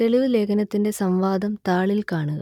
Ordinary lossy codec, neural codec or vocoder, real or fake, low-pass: none; none; real; 19.8 kHz